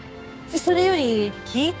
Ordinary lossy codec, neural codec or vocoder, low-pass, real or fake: none; codec, 16 kHz, 6 kbps, DAC; none; fake